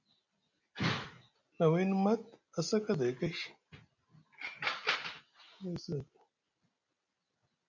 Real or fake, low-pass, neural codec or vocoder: real; 7.2 kHz; none